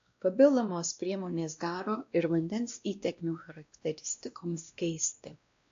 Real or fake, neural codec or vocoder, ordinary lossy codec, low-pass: fake; codec, 16 kHz, 1 kbps, X-Codec, WavLM features, trained on Multilingual LibriSpeech; AAC, 48 kbps; 7.2 kHz